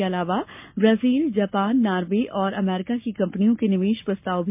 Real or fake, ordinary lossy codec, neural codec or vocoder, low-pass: real; MP3, 32 kbps; none; 3.6 kHz